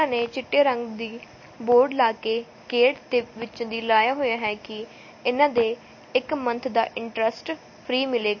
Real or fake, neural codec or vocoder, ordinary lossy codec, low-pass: real; none; MP3, 32 kbps; 7.2 kHz